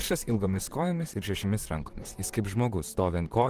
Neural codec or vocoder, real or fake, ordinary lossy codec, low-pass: vocoder, 44.1 kHz, 128 mel bands, Pupu-Vocoder; fake; Opus, 16 kbps; 14.4 kHz